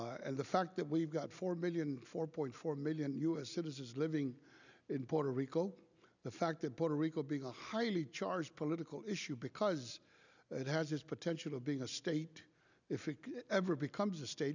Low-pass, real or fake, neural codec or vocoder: 7.2 kHz; real; none